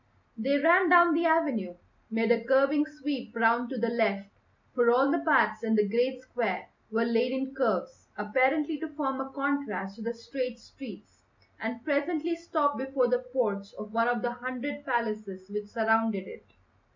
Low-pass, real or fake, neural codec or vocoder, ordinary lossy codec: 7.2 kHz; real; none; MP3, 64 kbps